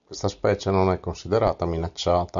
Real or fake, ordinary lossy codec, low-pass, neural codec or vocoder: real; MP3, 96 kbps; 7.2 kHz; none